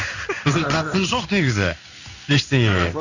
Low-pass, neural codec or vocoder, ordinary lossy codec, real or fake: 7.2 kHz; codec, 16 kHz in and 24 kHz out, 1 kbps, XY-Tokenizer; none; fake